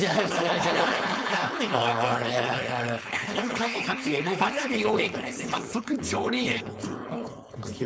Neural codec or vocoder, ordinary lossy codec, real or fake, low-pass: codec, 16 kHz, 4.8 kbps, FACodec; none; fake; none